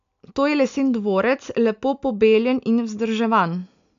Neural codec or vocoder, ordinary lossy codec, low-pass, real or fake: none; AAC, 96 kbps; 7.2 kHz; real